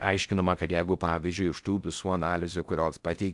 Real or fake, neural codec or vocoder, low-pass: fake; codec, 16 kHz in and 24 kHz out, 0.6 kbps, FocalCodec, streaming, 4096 codes; 10.8 kHz